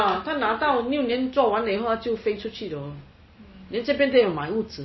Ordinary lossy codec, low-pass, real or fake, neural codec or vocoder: MP3, 24 kbps; 7.2 kHz; real; none